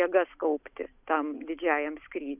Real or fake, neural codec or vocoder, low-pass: real; none; 3.6 kHz